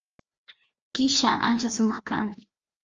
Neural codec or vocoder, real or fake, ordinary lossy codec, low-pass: codec, 16 kHz, 1 kbps, FreqCodec, larger model; fake; Opus, 24 kbps; 7.2 kHz